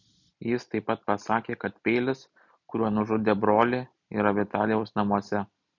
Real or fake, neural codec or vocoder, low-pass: real; none; 7.2 kHz